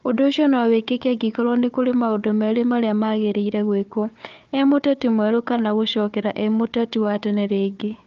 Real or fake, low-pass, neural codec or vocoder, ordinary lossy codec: fake; 7.2 kHz; codec, 16 kHz, 4 kbps, FunCodec, trained on Chinese and English, 50 frames a second; Opus, 24 kbps